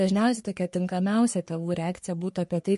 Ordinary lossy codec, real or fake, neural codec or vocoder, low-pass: MP3, 48 kbps; fake; codec, 44.1 kHz, 3.4 kbps, Pupu-Codec; 14.4 kHz